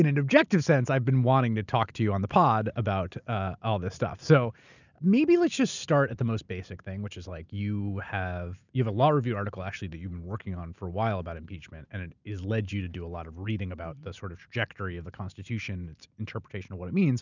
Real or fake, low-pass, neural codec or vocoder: real; 7.2 kHz; none